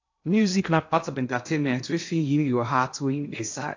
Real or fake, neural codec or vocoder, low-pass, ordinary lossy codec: fake; codec, 16 kHz in and 24 kHz out, 0.6 kbps, FocalCodec, streaming, 2048 codes; 7.2 kHz; AAC, 48 kbps